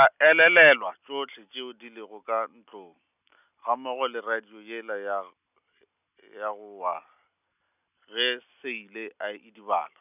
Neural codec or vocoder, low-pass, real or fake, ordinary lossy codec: none; 3.6 kHz; real; none